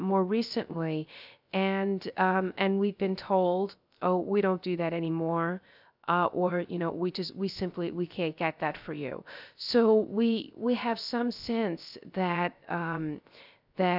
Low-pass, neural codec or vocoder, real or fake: 5.4 kHz; codec, 16 kHz, 0.3 kbps, FocalCodec; fake